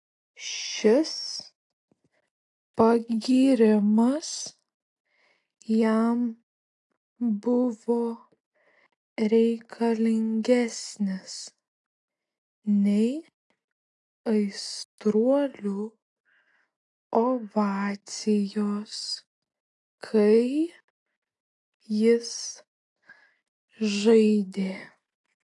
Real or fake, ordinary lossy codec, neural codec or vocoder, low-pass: real; AAC, 48 kbps; none; 10.8 kHz